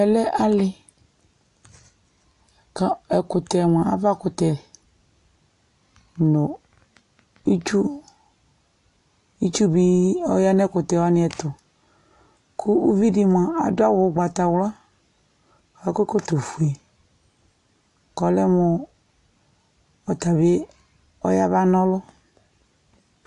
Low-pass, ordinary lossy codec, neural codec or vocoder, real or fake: 10.8 kHz; AAC, 48 kbps; none; real